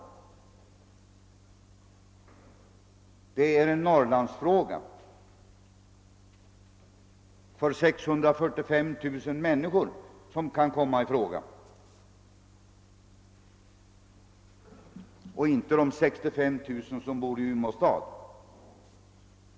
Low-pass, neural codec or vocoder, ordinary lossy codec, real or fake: none; none; none; real